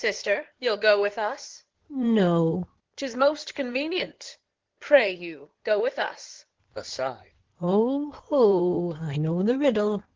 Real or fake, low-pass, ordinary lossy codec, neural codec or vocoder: fake; 7.2 kHz; Opus, 16 kbps; codec, 16 kHz in and 24 kHz out, 2.2 kbps, FireRedTTS-2 codec